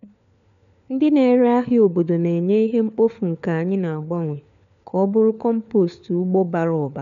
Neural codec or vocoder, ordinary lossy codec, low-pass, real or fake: codec, 16 kHz, 4 kbps, FunCodec, trained on LibriTTS, 50 frames a second; none; 7.2 kHz; fake